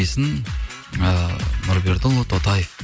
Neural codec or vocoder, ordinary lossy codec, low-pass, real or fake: none; none; none; real